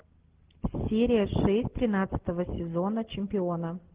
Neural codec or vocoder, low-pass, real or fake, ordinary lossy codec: none; 3.6 kHz; real; Opus, 16 kbps